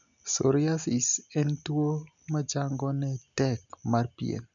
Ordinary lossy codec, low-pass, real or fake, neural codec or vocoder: MP3, 96 kbps; 7.2 kHz; real; none